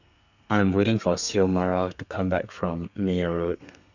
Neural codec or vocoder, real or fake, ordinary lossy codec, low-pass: codec, 32 kHz, 1.9 kbps, SNAC; fake; none; 7.2 kHz